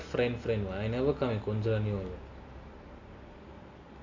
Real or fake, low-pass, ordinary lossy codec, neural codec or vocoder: real; 7.2 kHz; AAC, 48 kbps; none